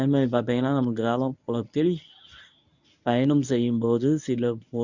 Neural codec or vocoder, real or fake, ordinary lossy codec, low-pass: codec, 24 kHz, 0.9 kbps, WavTokenizer, medium speech release version 1; fake; none; 7.2 kHz